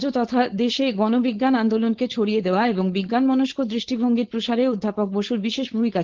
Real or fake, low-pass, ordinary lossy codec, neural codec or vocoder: fake; 7.2 kHz; Opus, 16 kbps; codec, 16 kHz, 4.8 kbps, FACodec